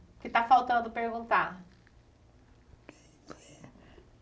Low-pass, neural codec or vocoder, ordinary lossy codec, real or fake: none; none; none; real